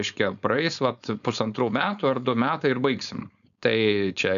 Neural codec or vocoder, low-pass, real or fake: codec, 16 kHz, 4.8 kbps, FACodec; 7.2 kHz; fake